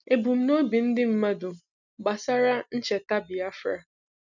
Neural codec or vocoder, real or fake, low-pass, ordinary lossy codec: none; real; 7.2 kHz; none